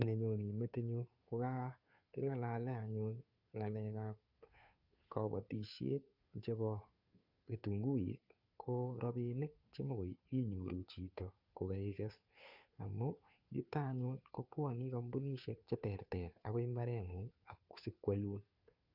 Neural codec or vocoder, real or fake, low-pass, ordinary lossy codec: codec, 16 kHz, 2 kbps, FunCodec, trained on Chinese and English, 25 frames a second; fake; 5.4 kHz; Opus, 64 kbps